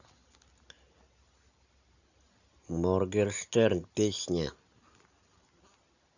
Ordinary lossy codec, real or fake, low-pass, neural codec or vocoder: none; real; 7.2 kHz; none